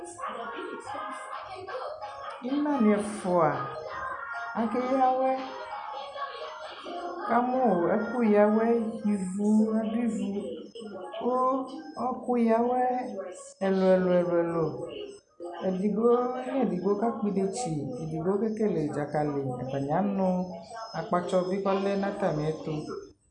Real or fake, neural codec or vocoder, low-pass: real; none; 9.9 kHz